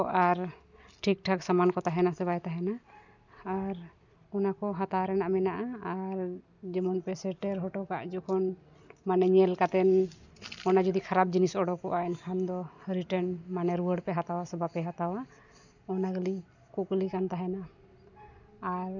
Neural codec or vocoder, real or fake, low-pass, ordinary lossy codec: none; real; 7.2 kHz; none